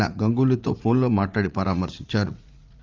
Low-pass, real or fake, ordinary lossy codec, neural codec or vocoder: 7.2 kHz; fake; Opus, 24 kbps; vocoder, 44.1 kHz, 80 mel bands, Vocos